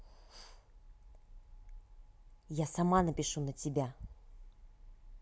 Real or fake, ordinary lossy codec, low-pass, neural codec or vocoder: real; none; none; none